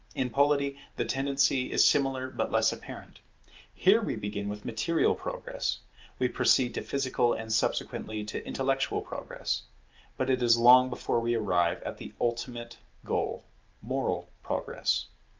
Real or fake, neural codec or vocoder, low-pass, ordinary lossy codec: real; none; 7.2 kHz; Opus, 24 kbps